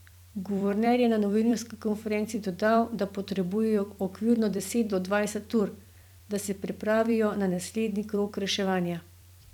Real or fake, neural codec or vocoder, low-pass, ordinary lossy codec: fake; vocoder, 44.1 kHz, 128 mel bands every 256 samples, BigVGAN v2; 19.8 kHz; none